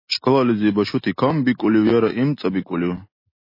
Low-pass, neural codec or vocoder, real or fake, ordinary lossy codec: 5.4 kHz; none; real; MP3, 24 kbps